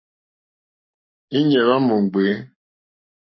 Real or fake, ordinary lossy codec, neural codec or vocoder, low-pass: fake; MP3, 24 kbps; codec, 44.1 kHz, 7.8 kbps, Pupu-Codec; 7.2 kHz